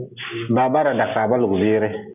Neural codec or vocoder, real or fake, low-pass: none; real; 3.6 kHz